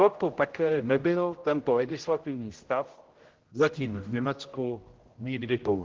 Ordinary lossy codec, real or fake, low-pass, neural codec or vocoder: Opus, 16 kbps; fake; 7.2 kHz; codec, 16 kHz, 0.5 kbps, X-Codec, HuBERT features, trained on general audio